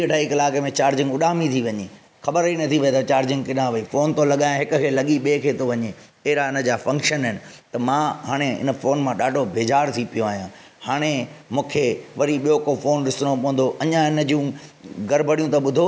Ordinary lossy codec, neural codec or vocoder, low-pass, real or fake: none; none; none; real